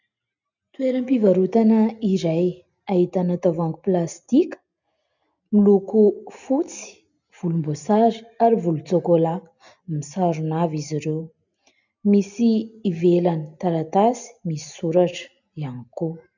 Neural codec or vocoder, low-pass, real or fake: none; 7.2 kHz; real